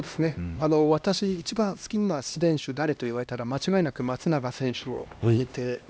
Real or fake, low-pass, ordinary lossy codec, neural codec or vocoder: fake; none; none; codec, 16 kHz, 1 kbps, X-Codec, HuBERT features, trained on LibriSpeech